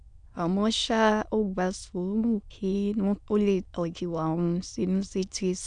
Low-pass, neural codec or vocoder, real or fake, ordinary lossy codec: 9.9 kHz; autoencoder, 22.05 kHz, a latent of 192 numbers a frame, VITS, trained on many speakers; fake; MP3, 96 kbps